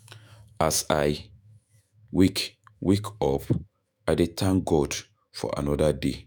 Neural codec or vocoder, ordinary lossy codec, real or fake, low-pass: autoencoder, 48 kHz, 128 numbers a frame, DAC-VAE, trained on Japanese speech; none; fake; none